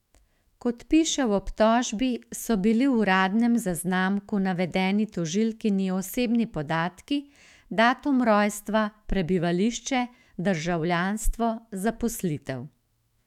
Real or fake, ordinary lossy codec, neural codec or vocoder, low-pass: fake; none; autoencoder, 48 kHz, 128 numbers a frame, DAC-VAE, trained on Japanese speech; 19.8 kHz